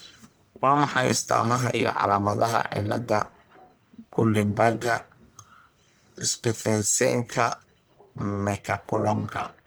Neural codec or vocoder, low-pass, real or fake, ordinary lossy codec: codec, 44.1 kHz, 1.7 kbps, Pupu-Codec; none; fake; none